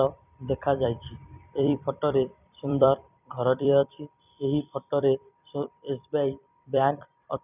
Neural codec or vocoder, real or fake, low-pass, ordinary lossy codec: vocoder, 44.1 kHz, 128 mel bands every 256 samples, BigVGAN v2; fake; 3.6 kHz; none